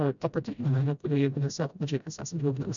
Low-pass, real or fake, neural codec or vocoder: 7.2 kHz; fake; codec, 16 kHz, 0.5 kbps, FreqCodec, smaller model